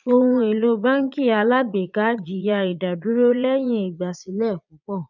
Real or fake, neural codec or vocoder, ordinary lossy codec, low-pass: fake; vocoder, 22.05 kHz, 80 mel bands, Vocos; none; 7.2 kHz